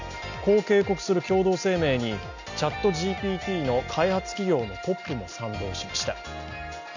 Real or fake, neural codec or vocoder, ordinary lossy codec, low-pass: real; none; none; 7.2 kHz